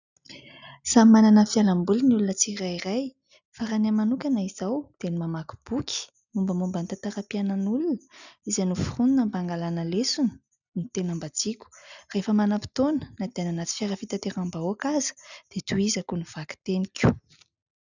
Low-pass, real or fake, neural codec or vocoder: 7.2 kHz; real; none